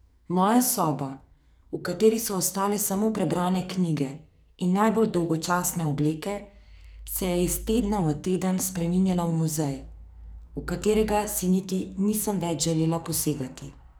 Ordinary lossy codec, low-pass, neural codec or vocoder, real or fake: none; none; codec, 44.1 kHz, 2.6 kbps, SNAC; fake